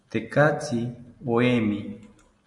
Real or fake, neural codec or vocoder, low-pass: real; none; 10.8 kHz